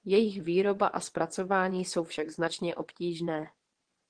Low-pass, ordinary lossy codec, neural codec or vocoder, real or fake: 9.9 kHz; Opus, 16 kbps; vocoder, 22.05 kHz, 80 mel bands, WaveNeXt; fake